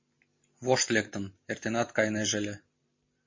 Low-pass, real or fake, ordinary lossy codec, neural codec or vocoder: 7.2 kHz; fake; MP3, 32 kbps; vocoder, 44.1 kHz, 128 mel bands every 512 samples, BigVGAN v2